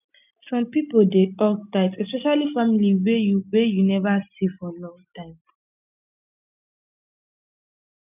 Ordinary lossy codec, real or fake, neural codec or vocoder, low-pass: none; real; none; 3.6 kHz